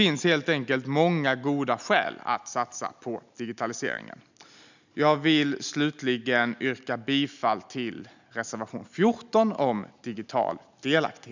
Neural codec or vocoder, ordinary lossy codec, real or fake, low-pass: none; none; real; 7.2 kHz